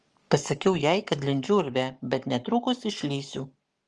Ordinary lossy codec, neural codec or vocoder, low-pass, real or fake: Opus, 24 kbps; codec, 44.1 kHz, 7.8 kbps, Pupu-Codec; 10.8 kHz; fake